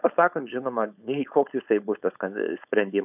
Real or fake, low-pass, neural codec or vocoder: fake; 3.6 kHz; codec, 16 kHz, 4.8 kbps, FACodec